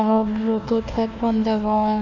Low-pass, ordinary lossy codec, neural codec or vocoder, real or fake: 7.2 kHz; none; codec, 16 kHz, 1 kbps, FunCodec, trained on Chinese and English, 50 frames a second; fake